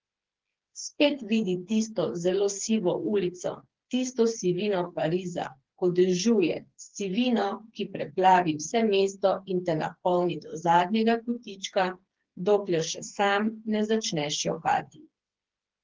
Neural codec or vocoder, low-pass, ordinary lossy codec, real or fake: codec, 16 kHz, 4 kbps, FreqCodec, smaller model; 7.2 kHz; Opus, 16 kbps; fake